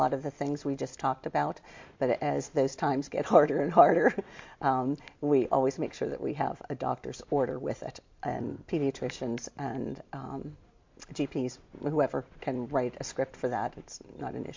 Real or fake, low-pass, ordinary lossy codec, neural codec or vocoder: real; 7.2 kHz; MP3, 48 kbps; none